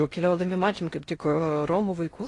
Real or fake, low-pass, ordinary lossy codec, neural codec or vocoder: fake; 10.8 kHz; AAC, 32 kbps; codec, 16 kHz in and 24 kHz out, 0.6 kbps, FocalCodec, streaming, 4096 codes